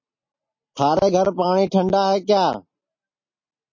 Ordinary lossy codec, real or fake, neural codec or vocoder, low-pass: MP3, 32 kbps; real; none; 7.2 kHz